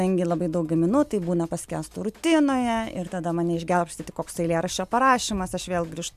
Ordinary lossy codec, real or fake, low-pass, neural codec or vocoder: MP3, 96 kbps; real; 14.4 kHz; none